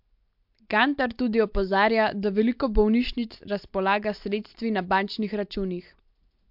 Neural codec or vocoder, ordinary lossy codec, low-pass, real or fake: none; MP3, 48 kbps; 5.4 kHz; real